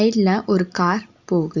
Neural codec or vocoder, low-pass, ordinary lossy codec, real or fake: none; 7.2 kHz; Opus, 64 kbps; real